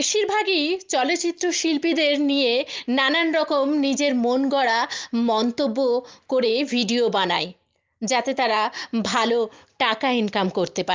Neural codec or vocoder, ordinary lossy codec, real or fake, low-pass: none; Opus, 32 kbps; real; 7.2 kHz